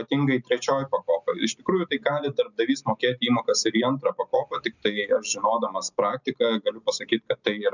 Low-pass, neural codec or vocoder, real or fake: 7.2 kHz; none; real